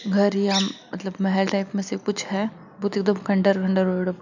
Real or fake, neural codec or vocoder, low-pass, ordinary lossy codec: real; none; 7.2 kHz; none